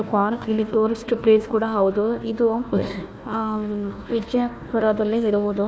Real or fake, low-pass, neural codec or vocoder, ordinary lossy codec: fake; none; codec, 16 kHz, 1 kbps, FunCodec, trained on Chinese and English, 50 frames a second; none